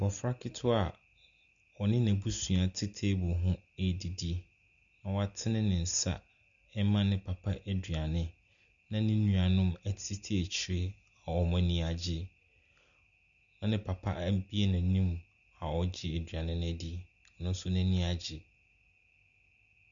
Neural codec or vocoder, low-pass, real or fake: none; 7.2 kHz; real